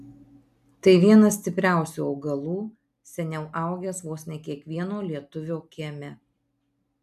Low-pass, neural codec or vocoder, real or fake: 14.4 kHz; none; real